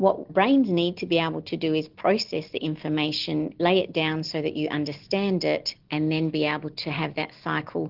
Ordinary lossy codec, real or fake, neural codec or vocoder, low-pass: Opus, 16 kbps; real; none; 5.4 kHz